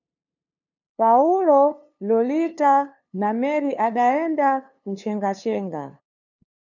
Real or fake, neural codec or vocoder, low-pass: fake; codec, 16 kHz, 2 kbps, FunCodec, trained on LibriTTS, 25 frames a second; 7.2 kHz